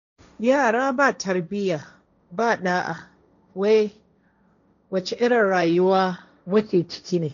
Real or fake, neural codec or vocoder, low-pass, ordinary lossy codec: fake; codec, 16 kHz, 1.1 kbps, Voila-Tokenizer; 7.2 kHz; none